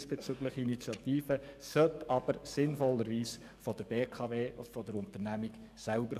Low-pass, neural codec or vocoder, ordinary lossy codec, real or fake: 14.4 kHz; codec, 44.1 kHz, 7.8 kbps, DAC; none; fake